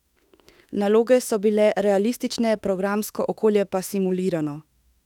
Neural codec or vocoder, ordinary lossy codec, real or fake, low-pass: autoencoder, 48 kHz, 32 numbers a frame, DAC-VAE, trained on Japanese speech; none; fake; 19.8 kHz